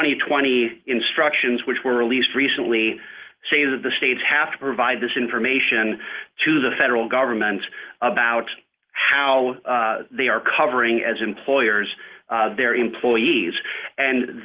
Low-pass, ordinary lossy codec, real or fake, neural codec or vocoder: 3.6 kHz; Opus, 16 kbps; real; none